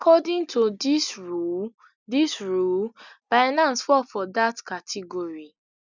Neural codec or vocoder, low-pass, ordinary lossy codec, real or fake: none; 7.2 kHz; none; real